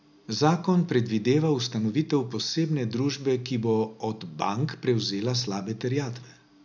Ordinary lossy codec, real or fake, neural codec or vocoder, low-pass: none; real; none; 7.2 kHz